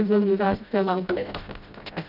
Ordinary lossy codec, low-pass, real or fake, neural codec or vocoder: none; 5.4 kHz; fake; codec, 16 kHz, 0.5 kbps, FreqCodec, smaller model